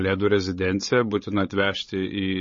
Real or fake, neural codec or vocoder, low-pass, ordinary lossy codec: fake; codec, 16 kHz, 16 kbps, FreqCodec, larger model; 7.2 kHz; MP3, 32 kbps